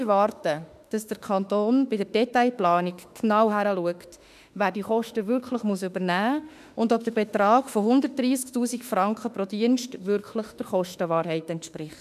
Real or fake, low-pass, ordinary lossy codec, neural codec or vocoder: fake; 14.4 kHz; none; autoencoder, 48 kHz, 32 numbers a frame, DAC-VAE, trained on Japanese speech